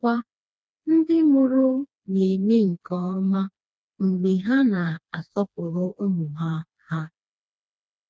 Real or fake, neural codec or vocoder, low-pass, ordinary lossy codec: fake; codec, 16 kHz, 2 kbps, FreqCodec, smaller model; none; none